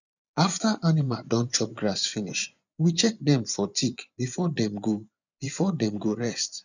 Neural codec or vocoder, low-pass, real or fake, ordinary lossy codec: vocoder, 24 kHz, 100 mel bands, Vocos; 7.2 kHz; fake; none